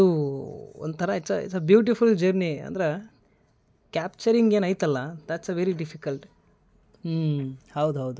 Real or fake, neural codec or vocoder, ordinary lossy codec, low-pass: real; none; none; none